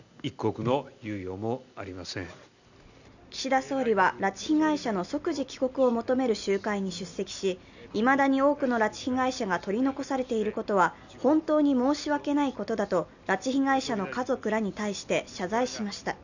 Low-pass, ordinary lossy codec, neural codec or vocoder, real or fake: 7.2 kHz; none; none; real